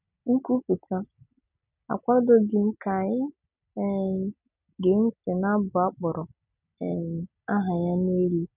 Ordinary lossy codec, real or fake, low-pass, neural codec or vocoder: none; real; 3.6 kHz; none